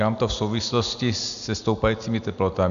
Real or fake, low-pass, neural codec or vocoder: real; 7.2 kHz; none